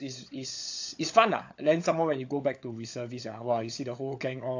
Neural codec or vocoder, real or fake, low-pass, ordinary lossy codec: codec, 16 kHz, 16 kbps, FunCodec, trained on LibriTTS, 50 frames a second; fake; 7.2 kHz; AAC, 48 kbps